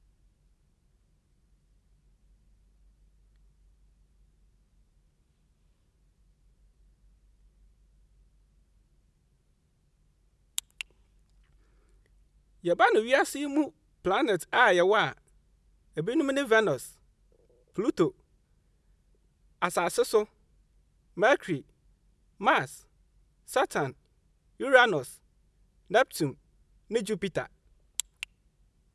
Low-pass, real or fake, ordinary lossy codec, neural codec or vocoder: none; real; none; none